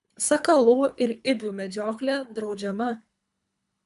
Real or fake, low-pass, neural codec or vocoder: fake; 10.8 kHz; codec, 24 kHz, 3 kbps, HILCodec